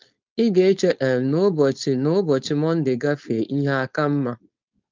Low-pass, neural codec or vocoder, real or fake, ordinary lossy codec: 7.2 kHz; codec, 16 kHz, 4.8 kbps, FACodec; fake; Opus, 24 kbps